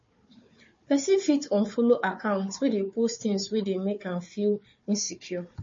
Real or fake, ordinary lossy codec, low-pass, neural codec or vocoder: fake; MP3, 32 kbps; 7.2 kHz; codec, 16 kHz, 4 kbps, FunCodec, trained on Chinese and English, 50 frames a second